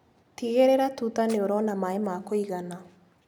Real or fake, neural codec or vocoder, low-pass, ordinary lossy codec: real; none; 19.8 kHz; none